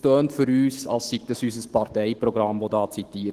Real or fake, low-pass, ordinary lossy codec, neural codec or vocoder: fake; 14.4 kHz; Opus, 16 kbps; autoencoder, 48 kHz, 128 numbers a frame, DAC-VAE, trained on Japanese speech